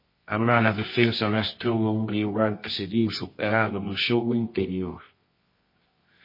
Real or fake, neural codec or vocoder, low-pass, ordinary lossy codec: fake; codec, 24 kHz, 0.9 kbps, WavTokenizer, medium music audio release; 5.4 kHz; MP3, 24 kbps